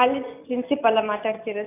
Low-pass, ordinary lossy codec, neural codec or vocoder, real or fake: 3.6 kHz; none; none; real